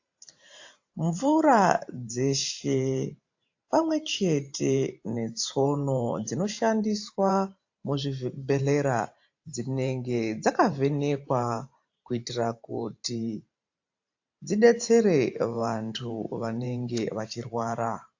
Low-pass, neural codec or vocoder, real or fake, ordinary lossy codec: 7.2 kHz; vocoder, 44.1 kHz, 128 mel bands every 512 samples, BigVGAN v2; fake; AAC, 48 kbps